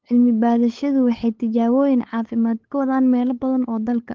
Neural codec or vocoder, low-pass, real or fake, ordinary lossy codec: codec, 16 kHz, 8 kbps, FunCodec, trained on LibriTTS, 25 frames a second; 7.2 kHz; fake; Opus, 16 kbps